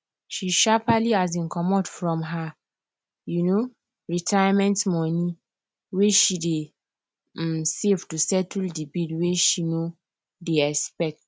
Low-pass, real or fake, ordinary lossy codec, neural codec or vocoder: none; real; none; none